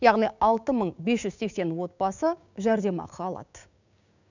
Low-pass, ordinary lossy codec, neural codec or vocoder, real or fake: 7.2 kHz; none; codec, 16 kHz, 6 kbps, DAC; fake